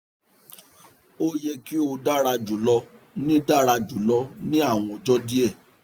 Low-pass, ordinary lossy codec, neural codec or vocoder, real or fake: none; none; none; real